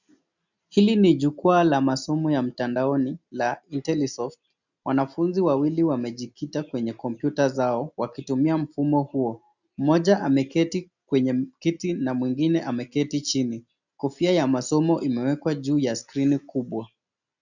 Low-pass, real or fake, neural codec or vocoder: 7.2 kHz; real; none